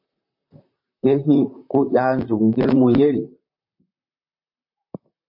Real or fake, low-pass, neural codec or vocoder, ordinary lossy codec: fake; 5.4 kHz; vocoder, 44.1 kHz, 128 mel bands, Pupu-Vocoder; MP3, 32 kbps